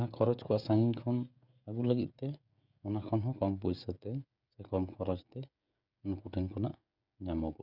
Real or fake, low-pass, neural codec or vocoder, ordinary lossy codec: fake; 5.4 kHz; codec, 16 kHz, 16 kbps, FreqCodec, smaller model; none